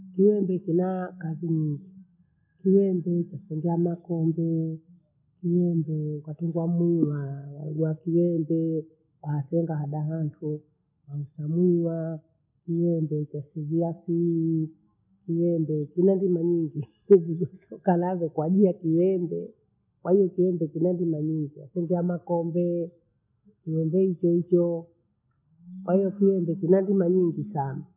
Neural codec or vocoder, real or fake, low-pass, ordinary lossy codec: none; real; 3.6 kHz; none